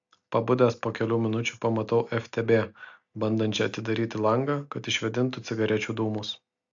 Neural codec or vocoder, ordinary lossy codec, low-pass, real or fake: none; AAC, 64 kbps; 7.2 kHz; real